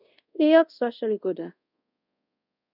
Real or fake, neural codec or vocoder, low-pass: fake; codec, 24 kHz, 0.5 kbps, DualCodec; 5.4 kHz